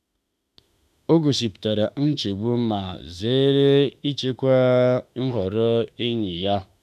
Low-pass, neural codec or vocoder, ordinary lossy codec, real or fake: 14.4 kHz; autoencoder, 48 kHz, 32 numbers a frame, DAC-VAE, trained on Japanese speech; none; fake